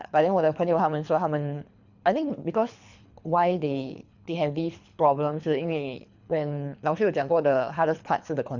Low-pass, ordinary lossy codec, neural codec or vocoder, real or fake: 7.2 kHz; none; codec, 24 kHz, 3 kbps, HILCodec; fake